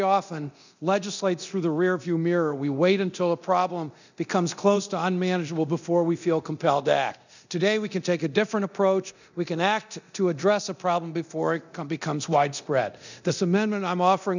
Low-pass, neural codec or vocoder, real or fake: 7.2 kHz; codec, 24 kHz, 0.9 kbps, DualCodec; fake